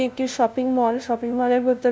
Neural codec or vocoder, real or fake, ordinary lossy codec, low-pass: codec, 16 kHz, 0.5 kbps, FunCodec, trained on LibriTTS, 25 frames a second; fake; none; none